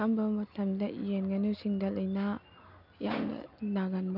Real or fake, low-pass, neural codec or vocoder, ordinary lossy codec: real; 5.4 kHz; none; none